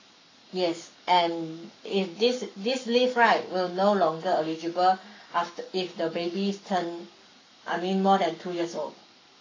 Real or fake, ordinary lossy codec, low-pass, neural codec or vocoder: fake; AAC, 32 kbps; 7.2 kHz; codec, 44.1 kHz, 7.8 kbps, Pupu-Codec